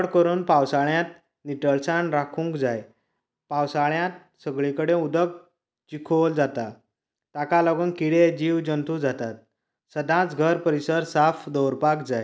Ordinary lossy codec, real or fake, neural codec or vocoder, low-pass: none; real; none; none